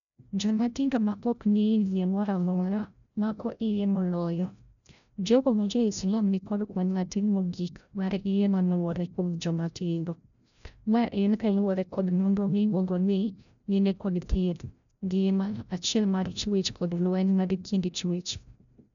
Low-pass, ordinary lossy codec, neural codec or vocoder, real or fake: 7.2 kHz; none; codec, 16 kHz, 0.5 kbps, FreqCodec, larger model; fake